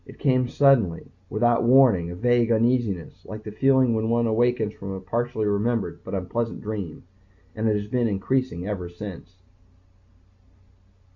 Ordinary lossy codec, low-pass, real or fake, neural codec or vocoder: Opus, 64 kbps; 7.2 kHz; real; none